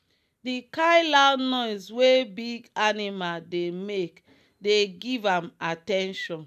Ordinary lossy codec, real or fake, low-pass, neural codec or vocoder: none; real; 14.4 kHz; none